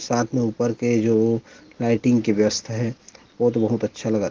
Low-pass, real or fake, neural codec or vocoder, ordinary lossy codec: 7.2 kHz; real; none; Opus, 16 kbps